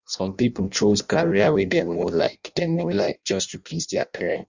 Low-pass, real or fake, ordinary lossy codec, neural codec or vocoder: 7.2 kHz; fake; Opus, 64 kbps; codec, 16 kHz in and 24 kHz out, 0.6 kbps, FireRedTTS-2 codec